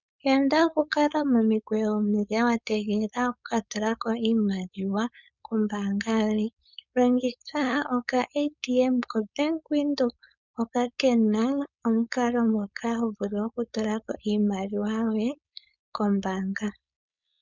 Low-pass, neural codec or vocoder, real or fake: 7.2 kHz; codec, 16 kHz, 4.8 kbps, FACodec; fake